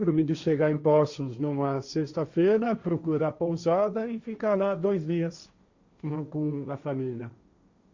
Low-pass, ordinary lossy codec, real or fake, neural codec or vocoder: 7.2 kHz; Opus, 64 kbps; fake; codec, 16 kHz, 1.1 kbps, Voila-Tokenizer